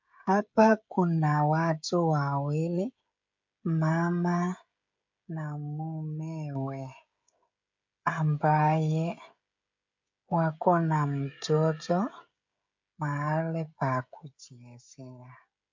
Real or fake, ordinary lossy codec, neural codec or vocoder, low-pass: fake; MP3, 48 kbps; codec, 16 kHz, 16 kbps, FreqCodec, smaller model; 7.2 kHz